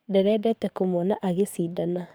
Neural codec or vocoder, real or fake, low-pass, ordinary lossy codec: codec, 44.1 kHz, 7.8 kbps, DAC; fake; none; none